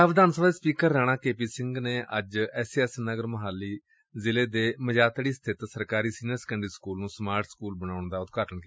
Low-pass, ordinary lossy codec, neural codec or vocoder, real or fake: none; none; none; real